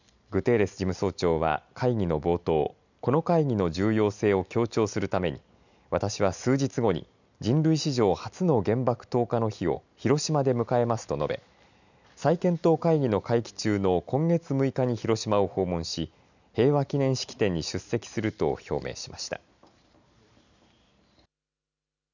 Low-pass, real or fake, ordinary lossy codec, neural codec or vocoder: 7.2 kHz; real; none; none